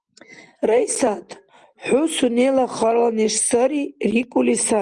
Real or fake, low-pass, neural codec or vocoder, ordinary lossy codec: real; 10.8 kHz; none; Opus, 24 kbps